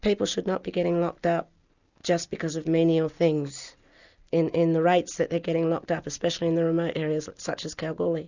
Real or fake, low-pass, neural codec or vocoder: real; 7.2 kHz; none